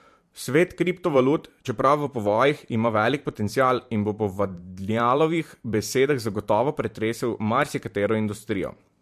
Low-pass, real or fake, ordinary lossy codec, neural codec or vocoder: 14.4 kHz; fake; MP3, 64 kbps; vocoder, 44.1 kHz, 128 mel bands every 512 samples, BigVGAN v2